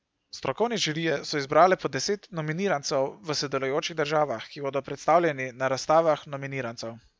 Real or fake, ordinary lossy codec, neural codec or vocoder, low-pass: real; none; none; none